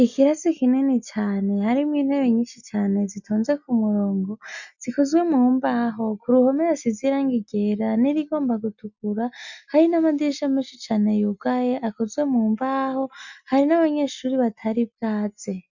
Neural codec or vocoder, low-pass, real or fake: none; 7.2 kHz; real